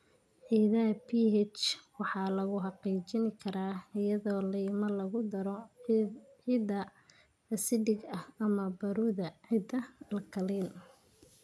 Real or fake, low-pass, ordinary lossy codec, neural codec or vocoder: real; none; none; none